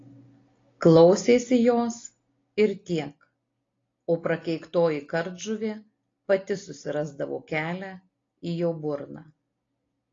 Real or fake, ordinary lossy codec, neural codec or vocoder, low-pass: real; AAC, 32 kbps; none; 7.2 kHz